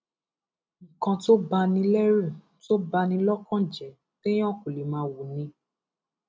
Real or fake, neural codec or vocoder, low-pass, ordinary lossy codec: real; none; none; none